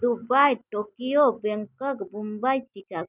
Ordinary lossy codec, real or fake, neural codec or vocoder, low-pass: none; real; none; 3.6 kHz